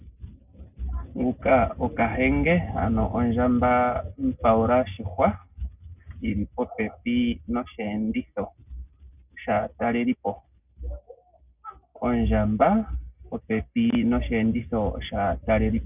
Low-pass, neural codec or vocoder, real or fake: 3.6 kHz; none; real